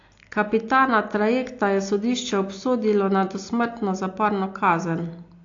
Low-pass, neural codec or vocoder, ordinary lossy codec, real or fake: 7.2 kHz; none; AAC, 48 kbps; real